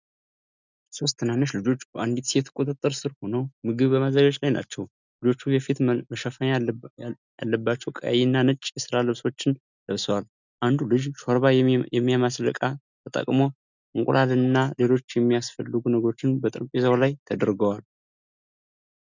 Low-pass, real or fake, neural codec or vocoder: 7.2 kHz; real; none